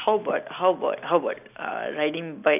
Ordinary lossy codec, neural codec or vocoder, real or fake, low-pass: none; none; real; 3.6 kHz